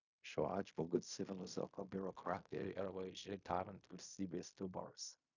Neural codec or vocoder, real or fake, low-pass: codec, 16 kHz in and 24 kHz out, 0.4 kbps, LongCat-Audio-Codec, fine tuned four codebook decoder; fake; 7.2 kHz